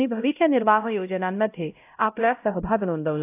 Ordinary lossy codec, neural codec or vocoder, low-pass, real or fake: AAC, 24 kbps; codec, 16 kHz, 0.5 kbps, X-Codec, HuBERT features, trained on LibriSpeech; 3.6 kHz; fake